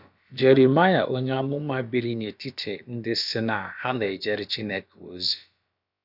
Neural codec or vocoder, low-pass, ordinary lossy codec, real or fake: codec, 16 kHz, about 1 kbps, DyCAST, with the encoder's durations; 5.4 kHz; none; fake